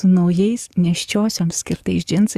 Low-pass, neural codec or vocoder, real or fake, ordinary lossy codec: 14.4 kHz; codec, 44.1 kHz, 7.8 kbps, DAC; fake; Opus, 64 kbps